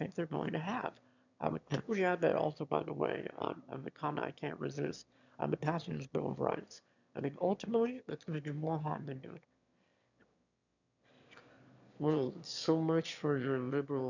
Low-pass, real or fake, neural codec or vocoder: 7.2 kHz; fake; autoencoder, 22.05 kHz, a latent of 192 numbers a frame, VITS, trained on one speaker